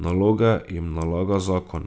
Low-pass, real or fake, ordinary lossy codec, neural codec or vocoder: none; real; none; none